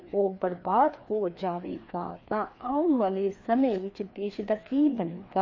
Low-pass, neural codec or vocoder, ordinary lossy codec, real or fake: 7.2 kHz; codec, 16 kHz, 2 kbps, FreqCodec, larger model; MP3, 32 kbps; fake